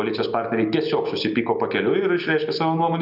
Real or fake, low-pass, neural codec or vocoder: real; 5.4 kHz; none